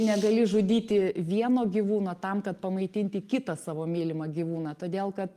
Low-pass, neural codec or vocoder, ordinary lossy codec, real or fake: 14.4 kHz; none; Opus, 32 kbps; real